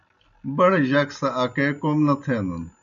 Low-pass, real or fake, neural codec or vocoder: 7.2 kHz; real; none